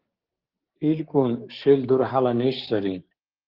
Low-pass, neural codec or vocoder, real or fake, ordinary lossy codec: 5.4 kHz; codec, 16 kHz, 6 kbps, DAC; fake; Opus, 16 kbps